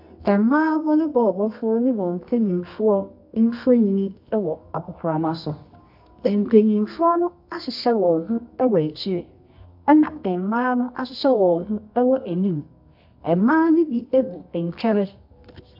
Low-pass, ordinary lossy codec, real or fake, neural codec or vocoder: 5.4 kHz; AAC, 48 kbps; fake; codec, 24 kHz, 0.9 kbps, WavTokenizer, medium music audio release